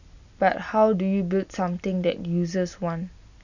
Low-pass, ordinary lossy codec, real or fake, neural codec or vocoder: 7.2 kHz; none; real; none